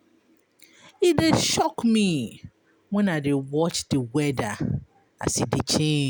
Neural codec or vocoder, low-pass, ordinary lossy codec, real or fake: none; none; none; real